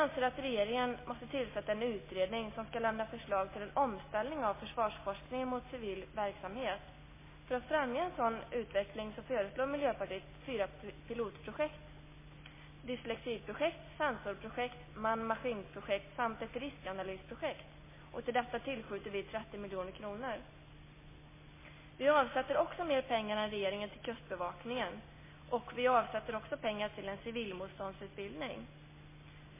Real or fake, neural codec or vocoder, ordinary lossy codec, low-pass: real; none; MP3, 16 kbps; 3.6 kHz